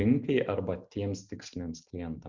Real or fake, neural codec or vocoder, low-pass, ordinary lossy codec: real; none; 7.2 kHz; Opus, 64 kbps